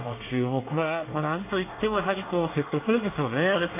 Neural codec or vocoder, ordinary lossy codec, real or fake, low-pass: codec, 24 kHz, 1 kbps, SNAC; AAC, 24 kbps; fake; 3.6 kHz